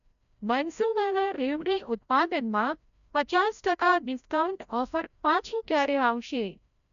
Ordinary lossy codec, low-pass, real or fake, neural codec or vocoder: none; 7.2 kHz; fake; codec, 16 kHz, 0.5 kbps, FreqCodec, larger model